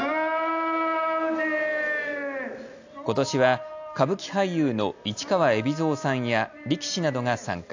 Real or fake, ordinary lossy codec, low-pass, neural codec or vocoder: real; AAC, 48 kbps; 7.2 kHz; none